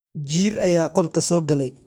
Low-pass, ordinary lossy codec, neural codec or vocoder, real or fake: none; none; codec, 44.1 kHz, 2.6 kbps, DAC; fake